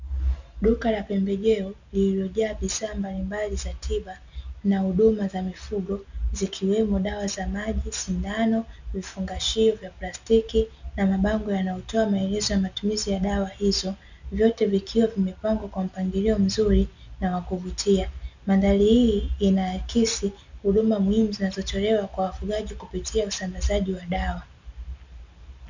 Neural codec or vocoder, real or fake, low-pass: none; real; 7.2 kHz